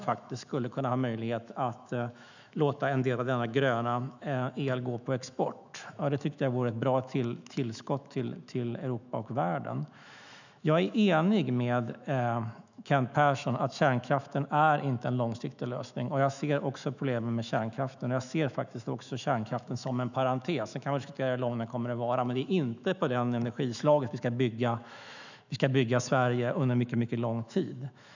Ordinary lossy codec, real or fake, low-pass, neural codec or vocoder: none; fake; 7.2 kHz; autoencoder, 48 kHz, 128 numbers a frame, DAC-VAE, trained on Japanese speech